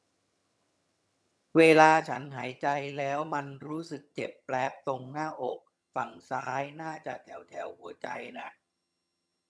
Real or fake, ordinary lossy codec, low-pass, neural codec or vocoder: fake; none; none; vocoder, 22.05 kHz, 80 mel bands, HiFi-GAN